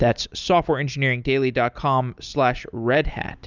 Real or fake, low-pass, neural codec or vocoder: real; 7.2 kHz; none